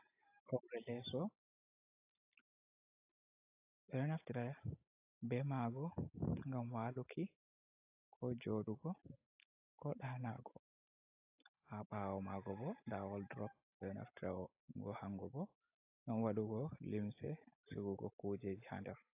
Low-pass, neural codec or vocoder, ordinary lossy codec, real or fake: 3.6 kHz; none; AAC, 32 kbps; real